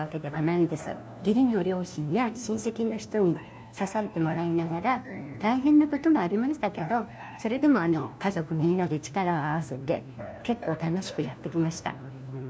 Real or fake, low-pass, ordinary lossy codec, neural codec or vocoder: fake; none; none; codec, 16 kHz, 1 kbps, FunCodec, trained on LibriTTS, 50 frames a second